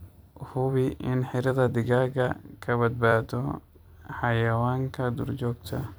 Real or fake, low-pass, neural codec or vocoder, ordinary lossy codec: fake; none; vocoder, 44.1 kHz, 128 mel bands every 256 samples, BigVGAN v2; none